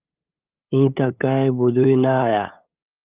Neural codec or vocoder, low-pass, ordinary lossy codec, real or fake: codec, 16 kHz, 8 kbps, FunCodec, trained on LibriTTS, 25 frames a second; 3.6 kHz; Opus, 24 kbps; fake